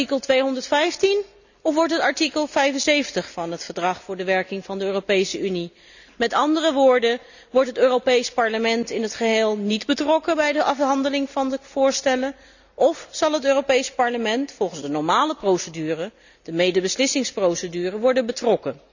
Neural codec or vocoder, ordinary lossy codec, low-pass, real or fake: none; none; 7.2 kHz; real